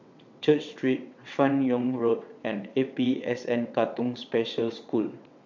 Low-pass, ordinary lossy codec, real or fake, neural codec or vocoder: 7.2 kHz; none; fake; vocoder, 22.05 kHz, 80 mel bands, WaveNeXt